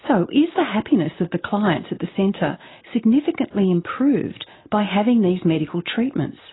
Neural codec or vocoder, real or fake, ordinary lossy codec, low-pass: none; real; AAC, 16 kbps; 7.2 kHz